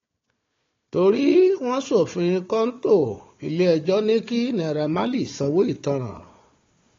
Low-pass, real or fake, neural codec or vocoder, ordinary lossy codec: 7.2 kHz; fake; codec, 16 kHz, 4 kbps, FunCodec, trained on Chinese and English, 50 frames a second; AAC, 32 kbps